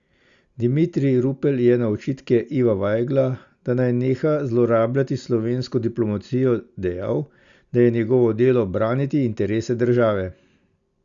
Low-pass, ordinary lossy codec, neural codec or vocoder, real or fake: 7.2 kHz; Opus, 64 kbps; none; real